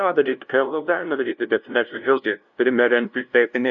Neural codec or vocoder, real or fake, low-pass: codec, 16 kHz, 0.5 kbps, FunCodec, trained on LibriTTS, 25 frames a second; fake; 7.2 kHz